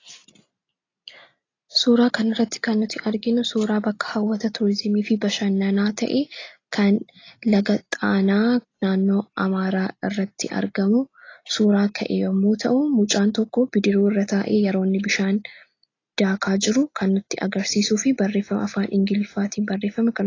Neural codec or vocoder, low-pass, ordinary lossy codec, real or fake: none; 7.2 kHz; AAC, 32 kbps; real